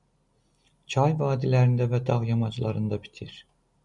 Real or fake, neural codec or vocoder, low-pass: real; none; 10.8 kHz